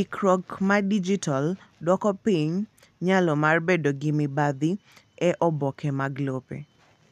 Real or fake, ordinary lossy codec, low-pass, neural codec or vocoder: real; none; 14.4 kHz; none